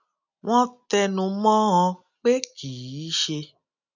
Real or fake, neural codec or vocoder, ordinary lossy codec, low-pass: real; none; none; 7.2 kHz